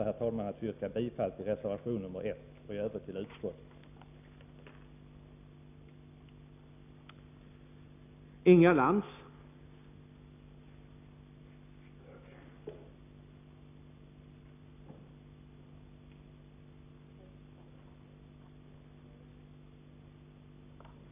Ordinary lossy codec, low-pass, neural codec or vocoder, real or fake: none; 3.6 kHz; none; real